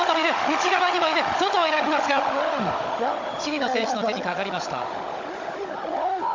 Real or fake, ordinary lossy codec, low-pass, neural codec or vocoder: fake; MP3, 48 kbps; 7.2 kHz; codec, 16 kHz, 16 kbps, FunCodec, trained on Chinese and English, 50 frames a second